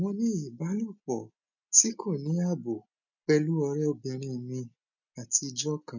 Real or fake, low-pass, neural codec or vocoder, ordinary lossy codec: real; 7.2 kHz; none; none